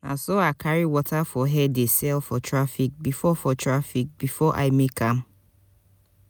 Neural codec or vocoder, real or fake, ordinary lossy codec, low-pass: none; real; none; none